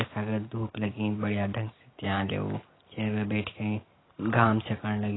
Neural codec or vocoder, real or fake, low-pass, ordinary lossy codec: none; real; 7.2 kHz; AAC, 16 kbps